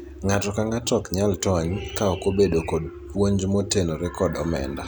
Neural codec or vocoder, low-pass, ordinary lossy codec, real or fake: none; none; none; real